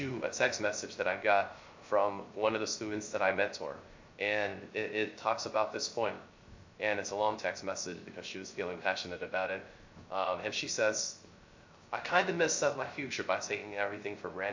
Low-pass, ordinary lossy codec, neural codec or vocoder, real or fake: 7.2 kHz; MP3, 48 kbps; codec, 16 kHz, 0.3 kbps, FocalCodec; fake